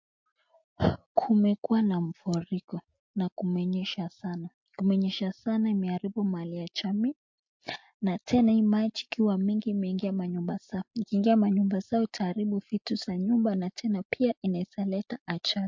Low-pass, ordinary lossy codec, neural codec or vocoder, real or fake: 7.2 kHz; MP3, 48 kbps; none; real